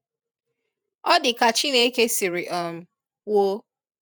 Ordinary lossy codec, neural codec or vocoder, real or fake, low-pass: none; vocoder, 48 kHz, 128 mel bands, Vocos; fake; none